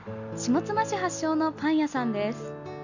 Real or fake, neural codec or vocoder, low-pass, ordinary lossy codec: real; none; 7.2 kHz; none